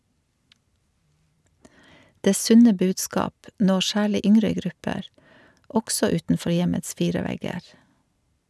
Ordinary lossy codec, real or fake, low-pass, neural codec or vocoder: none; real; none; none